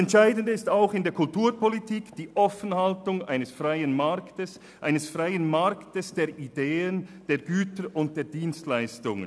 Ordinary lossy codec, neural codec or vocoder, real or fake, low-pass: none; none; real; none